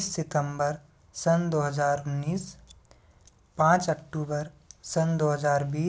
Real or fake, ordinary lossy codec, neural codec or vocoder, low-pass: real; none; none; none